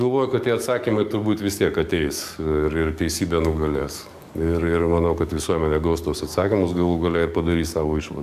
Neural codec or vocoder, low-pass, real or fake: codec, 44.1 kHz, 7.8 kbps, DAC; 14.4 kHz; fake